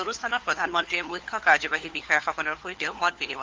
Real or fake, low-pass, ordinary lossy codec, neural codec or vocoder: fake; 7.2 kHz; Opus, 16 kbps; codec, 16 kHz, 4 kbps, FunCodec, trained on LibriTTS, 50 frames a second